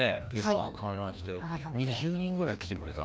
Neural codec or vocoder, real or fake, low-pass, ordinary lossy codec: codec, 16 kHz, 1 kbps, FreqCodec, larger model; fake; none; none